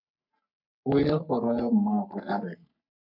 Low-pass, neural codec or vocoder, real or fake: 5.4 kHz; codec, 44.1 kHz, 3.4 kbps, Pupu-Codec; fake